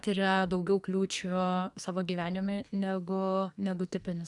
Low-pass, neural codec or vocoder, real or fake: 10.8 kHz; codec, 44.1 kHz, 2.6 kbps, SNAC; fake